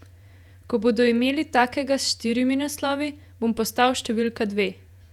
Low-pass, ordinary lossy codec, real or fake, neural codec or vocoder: 19.8 kHz; none; fake; vocoder, 48 kHz, 128 mel bands, Vocos